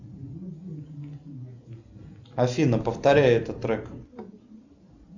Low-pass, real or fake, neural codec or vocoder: 7.2 kHz; real; none